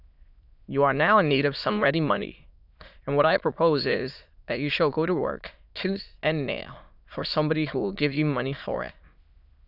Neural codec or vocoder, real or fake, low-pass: autoencoder, 22.05 kHz, a latent of 192 numbers a frame, VITS, trained on many speakers; fake; 5.4 kHz